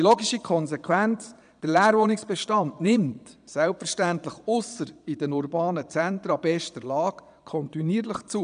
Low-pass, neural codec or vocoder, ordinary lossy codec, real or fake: 9.9 kHz; vocoder, 22.05 kHz, 80 mel bands, Vocos; none; fake